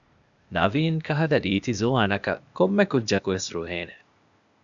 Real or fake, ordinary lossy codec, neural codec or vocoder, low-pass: fake; MP3, 96 kbps; codec, 16 kHz, 0.8 kbps, ZipCodec; 7.2 kHz